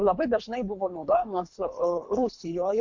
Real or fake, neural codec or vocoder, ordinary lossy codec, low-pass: fake; codec, 24 kHz, 3 kbps, HILCodec; MP3, 48 kbps; 7.2 kHz